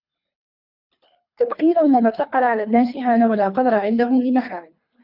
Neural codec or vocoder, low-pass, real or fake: codec, 24 kHz, 3 kbps, HILCodec; 5.4 kHz; fake